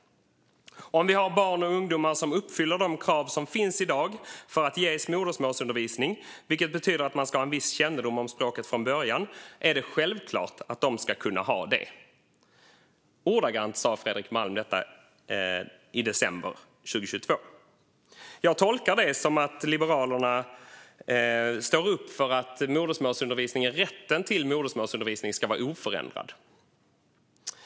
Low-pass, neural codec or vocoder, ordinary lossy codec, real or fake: none; none; none; real